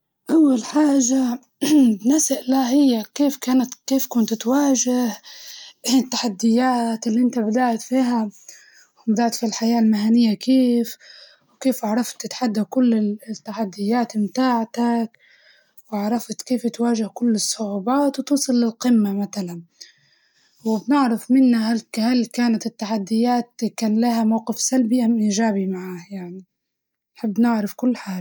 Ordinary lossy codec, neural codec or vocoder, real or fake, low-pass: none; none; real; none